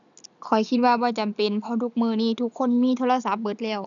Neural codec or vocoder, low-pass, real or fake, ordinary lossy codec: none; 7.2 kHz; real; none